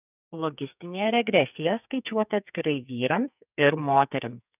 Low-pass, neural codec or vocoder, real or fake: 3.6 kHz; codec, 44.1 kHz, 2.6 kbps, SNAC; fake